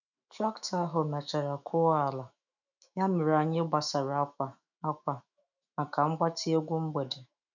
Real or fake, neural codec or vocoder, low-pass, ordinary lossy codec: fake; autoencoder, 48 kHz, 128 numbers a frame, DAC-VAE, trained on Japanese speech; 7.2 kHz; none